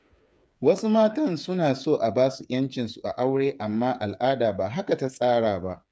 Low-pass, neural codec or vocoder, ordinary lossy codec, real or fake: none; codec, 16 kHz, 8 kbps, FreqCodec, smaller model; none; fake